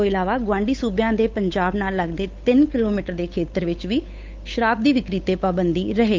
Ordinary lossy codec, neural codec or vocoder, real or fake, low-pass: Opus, 24 kbps; codec, 16 kHz, 8 kbps, FunCodec, trained on Chinese and English, 25 frames a second; fake; 7.2 kHz